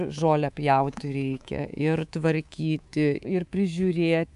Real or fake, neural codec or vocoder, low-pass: fake; codec, 24 kHz, 3.1 kbps, DualCodec; 10.8 kHz